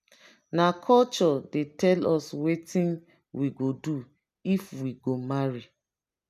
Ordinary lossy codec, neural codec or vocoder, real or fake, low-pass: none; none; real; 14.4 kHz